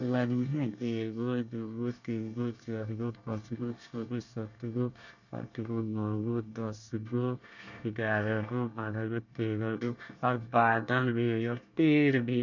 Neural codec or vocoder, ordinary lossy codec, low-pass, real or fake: codec, 24 kHz, 1 kbps, SNAC; none; 7.2 kHz; fake